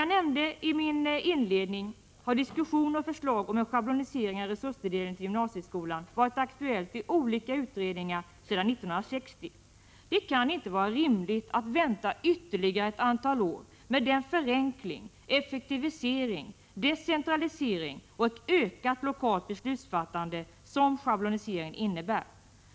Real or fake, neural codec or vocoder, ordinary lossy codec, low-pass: real; none; none; none